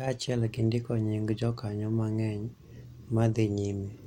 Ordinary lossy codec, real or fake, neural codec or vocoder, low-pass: MP3, 64 kbps; real; none; 19.8 kHz